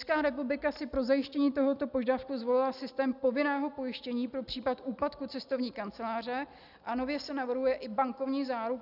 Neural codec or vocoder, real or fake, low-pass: none; real; 5.4 kHz